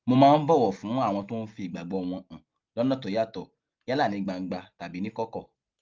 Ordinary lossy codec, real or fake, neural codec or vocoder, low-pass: Opus, 32 kbps; real; none; 7.2 kHz